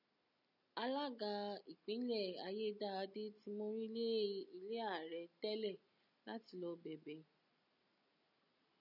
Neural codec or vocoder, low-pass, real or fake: none; 5.4 kHz; real